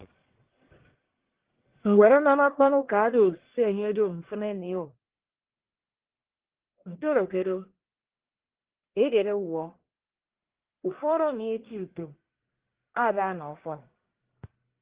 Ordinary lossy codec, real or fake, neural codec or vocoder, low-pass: Opus, 16 kbps; fake; codec, 44.1 kHz, 1.7 kbps, Pupu-Codec; 3.6 kHz